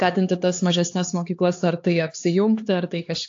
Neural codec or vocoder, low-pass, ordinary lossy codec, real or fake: codec, 16 kHz, 4 kbps, X-Codec, HuBERT features, trained on LibriSpeech; 7.2 kHz; AAC, 48 kbps; fake